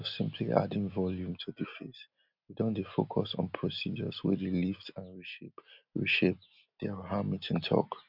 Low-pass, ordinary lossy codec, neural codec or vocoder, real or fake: 5.4 kHz; none; none; real